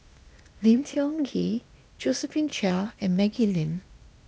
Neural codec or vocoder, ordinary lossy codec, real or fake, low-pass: codec, 16 kHz, 0.8 kbps, ZipCodec; none; fake; none